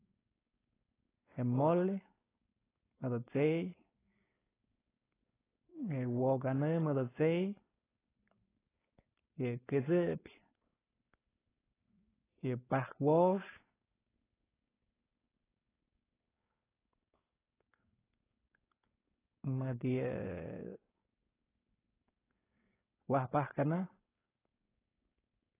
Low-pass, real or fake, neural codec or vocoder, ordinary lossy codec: 3.6 kHz; fake; codec, 16 kHz, 4.8 kbps, FACodec; AAC, 16 kbps